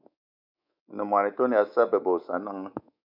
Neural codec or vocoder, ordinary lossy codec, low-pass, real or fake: codec, 24 kHz, 3.1 kbps, DualCodec; MP3, 48 kbps; 5.4 kHz; fake